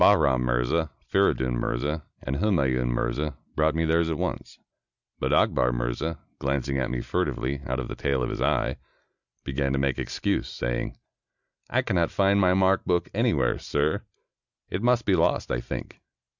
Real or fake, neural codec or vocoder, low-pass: real; none; 7.2 kHz